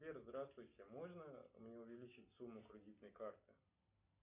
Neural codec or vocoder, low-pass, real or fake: none; 3.6 kHz; real